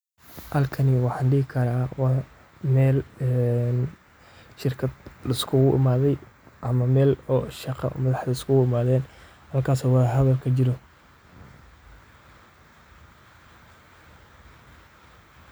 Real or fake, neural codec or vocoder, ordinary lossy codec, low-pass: real; none; none; none